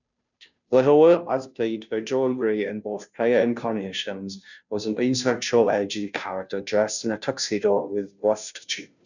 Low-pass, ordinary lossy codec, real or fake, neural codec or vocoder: 7.2 kHz; none; fake; codec, 16 kHz, 0.5 kbps, FunCodec, trained on Chinese and English, 25 frames a second